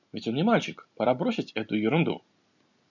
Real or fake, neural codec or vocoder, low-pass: real; none; 7.2 kHz